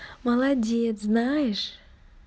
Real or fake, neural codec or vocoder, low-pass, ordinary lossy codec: real; none; none; none